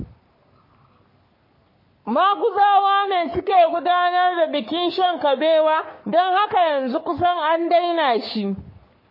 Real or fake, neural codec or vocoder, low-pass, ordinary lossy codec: fake; codec, 44.1 kHz, 3.4 kbps, Pupu-Codec; 5.4 kHz; MP3, 24 kbps